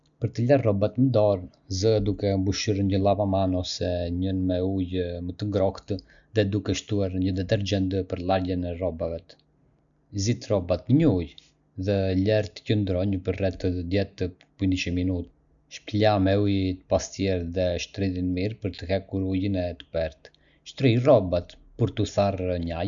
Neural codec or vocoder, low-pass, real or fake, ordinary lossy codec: none; 7.2 kHz; real; none